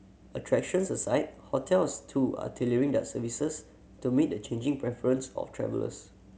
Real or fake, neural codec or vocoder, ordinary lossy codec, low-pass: real; none; none; none